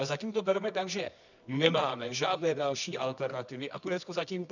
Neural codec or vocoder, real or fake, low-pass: codec, 24 kHz, 0.9 kbps, WavTokenizer, medium music audio release; fake; 7.2 kHz